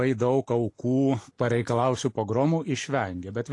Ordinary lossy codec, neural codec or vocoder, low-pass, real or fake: AAC, 48 kbps; none; 10.8 kHz; real